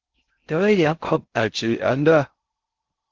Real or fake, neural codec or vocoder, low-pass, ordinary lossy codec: fake; codec, 16 kHz in and 24 kHz out, 0.6 kbps, FocalCodec, streaming, 4096 codes; 7.2 kHz; Opus, 16 kbps